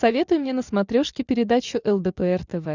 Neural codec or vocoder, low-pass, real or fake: none; 7.2 kHz; real